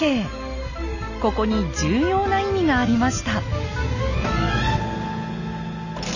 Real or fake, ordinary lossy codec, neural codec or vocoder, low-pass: real; none; none; 7.2 kHz